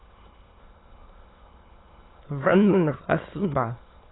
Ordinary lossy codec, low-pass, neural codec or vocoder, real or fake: AAC, 16 kbps; 7.2 kHz; autoencoder, 22.05 kHz, a latent of 192 numbers a frame, VITS, trained on many speakers; fake